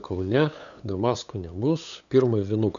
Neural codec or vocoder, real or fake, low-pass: codec, 16 kHz, 8 kbps, FunCodec, trained on LibriTTS, 25 frames a second; fake; 7.2 kHz